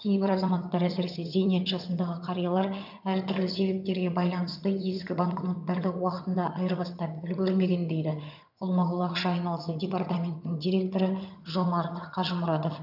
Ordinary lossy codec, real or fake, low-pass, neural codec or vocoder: none; fake; 5.4 kHz; vocoder, 22.05 kHz, 80 mel bands, HiFi-GAN